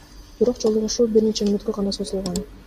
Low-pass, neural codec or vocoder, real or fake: 14.4 kHz; none; real